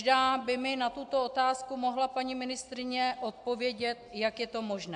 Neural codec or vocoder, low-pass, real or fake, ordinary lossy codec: none; 9.9 kHz; real; AAC, 64 kbps